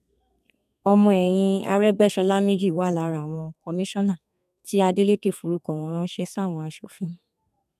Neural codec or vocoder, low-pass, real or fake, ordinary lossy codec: codec, 32 kHz, 1.9 kbps, SNAC; 14.4 kHz; fake; none